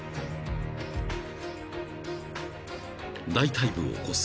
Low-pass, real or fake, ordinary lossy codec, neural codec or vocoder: none; real; none; none